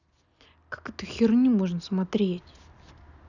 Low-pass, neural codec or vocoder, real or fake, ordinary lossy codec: 7.2 kHz; none; real; none